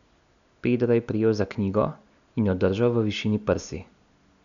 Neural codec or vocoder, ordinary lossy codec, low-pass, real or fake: none; none; 7.2 kHz; real